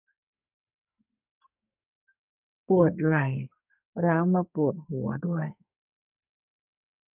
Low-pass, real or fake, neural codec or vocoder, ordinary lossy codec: 3.6 kHz; fake; codec, 44.1 kHz, 2.6 kbps, SNAC; none